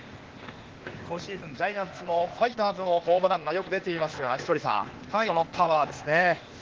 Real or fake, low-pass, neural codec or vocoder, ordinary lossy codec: fake; 7.2 kHz; codec, 16 kHz, 0.8 kbps, ZipCodec; Opus, 16 kbps